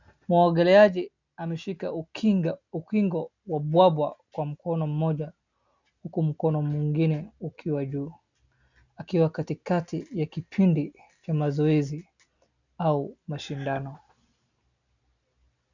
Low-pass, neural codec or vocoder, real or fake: 7.2 kHz; none; real